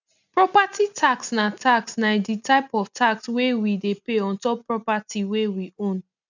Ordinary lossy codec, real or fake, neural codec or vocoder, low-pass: none; real; none; 7.2 kHz